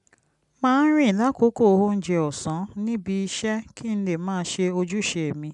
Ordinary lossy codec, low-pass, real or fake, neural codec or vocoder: MP3, 96 kbps; 10.8 kHz; real; none